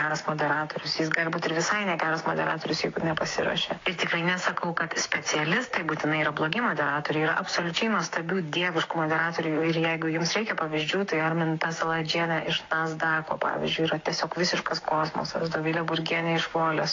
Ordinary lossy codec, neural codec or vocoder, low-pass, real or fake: AAC, 32 kbps; codec, 16 kHz, 6 kbps, DAC; 7.2 kHz; fake